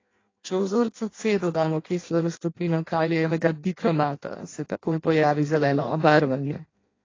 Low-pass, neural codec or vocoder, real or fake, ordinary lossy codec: 7.2 kHz; codec, 16 kHz in and 24 kHz out, 0.6 kbps, FireRedTTS-2 codec; fake; AAC, 32 kbps